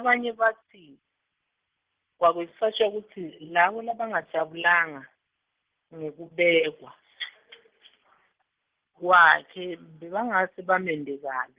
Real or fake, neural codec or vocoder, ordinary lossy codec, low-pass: real; none; Opus, 16 kbps; 3.6 kHz